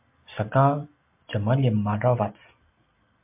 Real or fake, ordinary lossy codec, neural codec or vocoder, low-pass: real; MP3, 32 kbps; none; 3.6 kHz